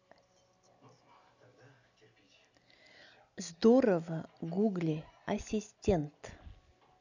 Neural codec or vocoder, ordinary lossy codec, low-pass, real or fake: none; none; 7.2 kHz; real